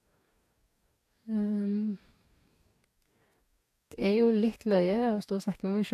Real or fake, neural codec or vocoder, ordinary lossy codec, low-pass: fake; codec, 44.1 kHz, 2.6 kbps, DAC; AAC, 96 kbps; 14.4 kHz